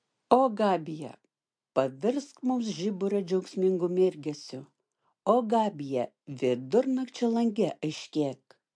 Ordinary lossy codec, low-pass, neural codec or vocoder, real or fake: MP3, 64 kbps; 9.9 kHz; none; real